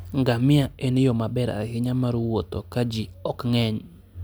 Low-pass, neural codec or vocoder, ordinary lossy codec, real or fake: none; none; none; real